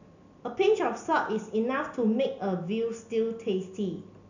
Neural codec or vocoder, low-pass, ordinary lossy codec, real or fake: none; 7.2 kHz; none; real